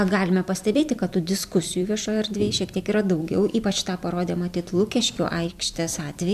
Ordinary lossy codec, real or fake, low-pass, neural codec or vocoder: MP3, 96 kbps; fake; 14.4 kHz; vocoder, 44.1 kHz, 128 mel bands every 256 samples, BigVGAN v2